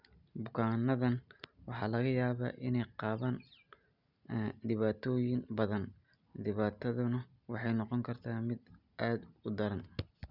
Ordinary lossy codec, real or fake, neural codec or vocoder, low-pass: none; real; none; 5.4 kHz